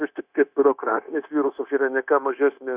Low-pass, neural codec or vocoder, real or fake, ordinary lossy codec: 3.6 kHz; codec, 16 kHz, 0.9 kbps, LongCat-Audio-Codec; fake; Opus, 64 kbps